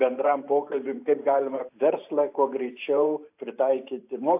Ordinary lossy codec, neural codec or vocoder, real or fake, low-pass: AAC, 32 kbps; vocoder, 44.1 kHz, 128 mel bands every 512 samples, BigVGAN v2; fake; 3.6 kHz